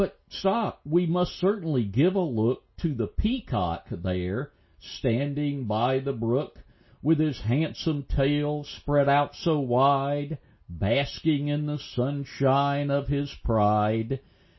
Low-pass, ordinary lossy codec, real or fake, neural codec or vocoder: 7.2 kHz; MP3, 24 kbps; real; none